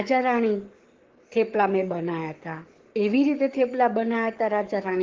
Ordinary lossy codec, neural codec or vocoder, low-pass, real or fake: Opus, 16 kbps; codec, 44.1 kHz, 7.8 kbps, Pupu-Codec; 7.2 kHz; fake